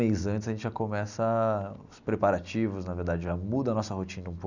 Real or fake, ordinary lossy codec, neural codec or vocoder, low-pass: real; none; none; 7.2 kHz